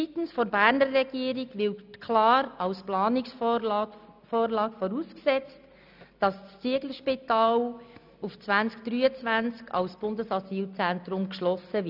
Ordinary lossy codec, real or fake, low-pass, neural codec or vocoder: none; real; 5.4 kHz; none